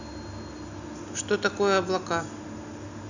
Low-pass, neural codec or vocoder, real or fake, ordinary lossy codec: 7.2 kHz; none; real; none